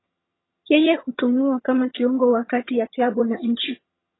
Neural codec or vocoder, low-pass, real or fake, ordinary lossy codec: vocoder, 22.05 kHz, 80 mel bands, HiFi-GAN; 7.2 kHz; fake; AAC, 16 kbps